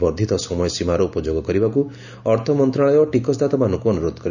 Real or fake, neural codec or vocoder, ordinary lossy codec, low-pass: real; none; none; 7.2 kHz